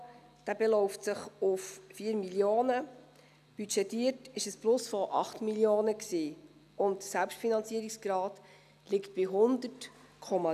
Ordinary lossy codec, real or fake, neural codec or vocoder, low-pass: none; real; none; 14.4 kHz